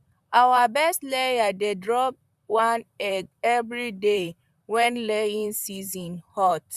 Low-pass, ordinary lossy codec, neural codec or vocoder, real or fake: 14.4 kHz; none; vocoder, 44.1 kHz, 128 mel bands, Pupu-Vocoder; fake